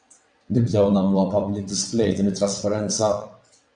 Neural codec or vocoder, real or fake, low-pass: vocoder, 22.05 kHz, 80 mel bands, WaveNeXt; fake; 9.9 kHz